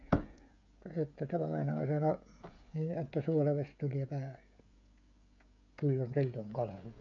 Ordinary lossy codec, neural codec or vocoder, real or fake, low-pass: AAC, 64 kbps; codec, 16 kHz, 16 kbps, FreqCodec, smaller model; fake; 7.2 kHz